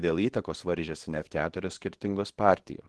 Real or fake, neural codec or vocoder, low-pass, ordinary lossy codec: fake; codec, 24 kHz, 0.9 kbps, WavTokenizer, small release; 10.8 kHz; Opus, 16 kbps